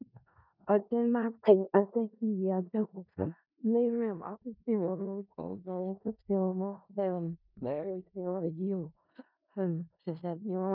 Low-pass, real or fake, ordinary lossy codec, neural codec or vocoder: 5.4 kHz; fake; none; codec, 16 kHz in and 24 kHz out, 0.4 kbps, LongCat-Audio-Codec, four codebook decoder